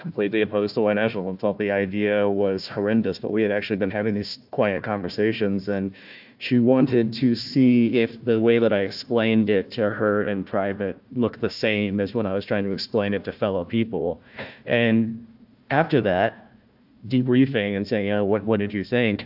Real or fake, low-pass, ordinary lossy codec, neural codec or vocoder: fake; 5.4 kHz; AAC, 48 kbps; codec, 16 kHz, 1 kbps, FunCodec, trained on Chinese and English, 50 frames a second